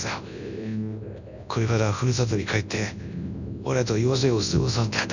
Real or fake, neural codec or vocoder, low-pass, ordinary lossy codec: fake; codec, 24 kHz, 0.9 kbps, WavTokenizer, large speech release; 7.2 kHz; none